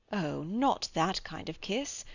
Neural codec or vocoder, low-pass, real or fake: none; 7.2 kHz; real